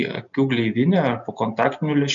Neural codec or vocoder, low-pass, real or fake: none; 7.2 kHz; real